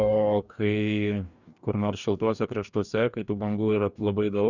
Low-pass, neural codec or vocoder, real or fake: 7.2 kHz; codec, 44.1 kHz, 2.6 kbps, DAC; fake